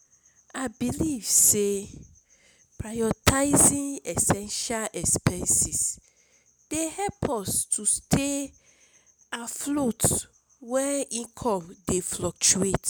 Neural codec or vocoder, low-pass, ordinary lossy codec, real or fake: none; none; none; real